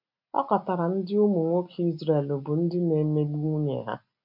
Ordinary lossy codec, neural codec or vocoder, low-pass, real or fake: MP3, 48 kbps; none; 5.4 kHz; real